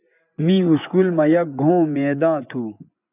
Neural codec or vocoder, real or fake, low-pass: vocoder, 24 kHz, 100 mel bands, Vocos; fake; 3.6 kHz